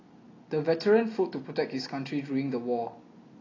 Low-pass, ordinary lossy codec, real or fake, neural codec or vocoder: 7.2 kHz; AAC, 32 kbps; real; none